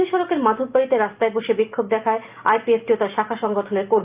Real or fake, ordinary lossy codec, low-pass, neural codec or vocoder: real; Opus, 24 kbps; 3.6 kHz; none